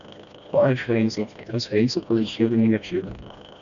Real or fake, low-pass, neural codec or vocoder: fake; 7.2 kHz; codec, 16 kHz, 1 kbps, FreqCodec, smaller model